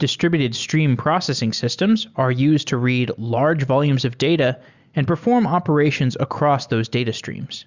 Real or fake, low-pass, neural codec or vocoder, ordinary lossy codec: real; 7.2 kHz; none; Opus, 64 kbps